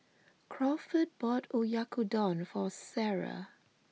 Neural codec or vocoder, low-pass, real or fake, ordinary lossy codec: none; none; real; none